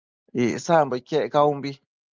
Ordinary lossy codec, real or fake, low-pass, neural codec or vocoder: Opus, 24 kbps; real; 7.2 kHz; none